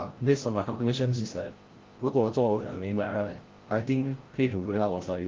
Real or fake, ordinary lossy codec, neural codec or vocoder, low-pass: fake; Opus, 16 kbps; codec, 16 kHz, 0.5 kbps, FreqCodec, larger model; 7.2 kHz